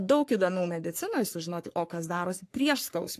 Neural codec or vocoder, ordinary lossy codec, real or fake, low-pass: codec, 44.1 kHz, 3.4 kbps, Pupu-Codec; AAC, 64 kbps; fake; 14.4 kHz